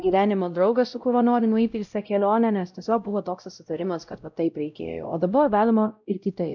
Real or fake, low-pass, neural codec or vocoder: fake; 7.2 kHz; codec, 16 kHz, 0.5 kbps, X-Codec, WavLM features, trained on Multilingual LibriSpeech